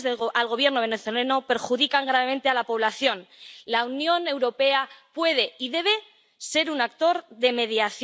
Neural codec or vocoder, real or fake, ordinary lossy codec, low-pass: none; real; none; none